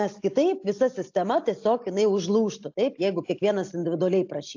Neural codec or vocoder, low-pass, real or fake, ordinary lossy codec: none; 7.2 kHz; real; AAC, 48 kbps